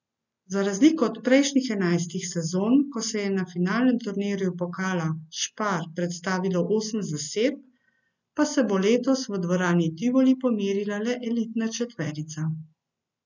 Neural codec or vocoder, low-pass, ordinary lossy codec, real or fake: none; 7.2 kHz; none; real